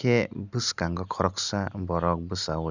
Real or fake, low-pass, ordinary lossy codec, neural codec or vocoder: real; 7.2 kHz; none; none